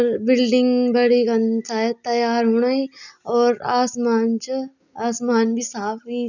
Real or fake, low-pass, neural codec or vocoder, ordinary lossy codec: real; 7.2 kHz; none; none